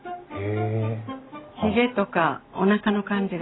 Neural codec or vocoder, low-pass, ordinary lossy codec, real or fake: none; 7.2 kHz; AAC, 16 kbps; real